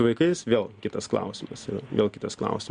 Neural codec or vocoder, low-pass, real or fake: none; 9.9 kHz; real